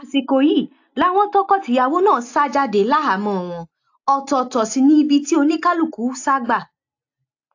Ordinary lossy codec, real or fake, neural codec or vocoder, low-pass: AAC, 48 kbps; real; none; 7.2 kHz